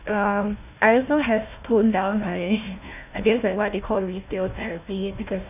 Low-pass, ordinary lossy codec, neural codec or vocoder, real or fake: 3.6 kHz; none; codec, 16 kHz, 1 kbps, FunCodec, trained on Chinese and English, 50 frames a second; fake